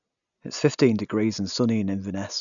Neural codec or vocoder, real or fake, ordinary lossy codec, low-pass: none; real; none; 7.2 kHz